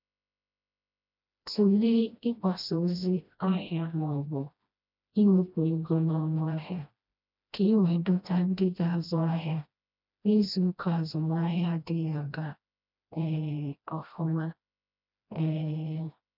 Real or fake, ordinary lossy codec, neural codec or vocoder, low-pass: fake; none; codec, 16 kHz, 1 kbps, FreqCodec, smaller model; 5.4 kHz